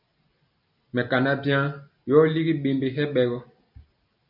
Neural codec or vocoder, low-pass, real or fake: none; 5.4 kHz; real